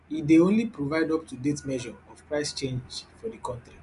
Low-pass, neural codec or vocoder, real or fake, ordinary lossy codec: 10.8 kHz; none; real; none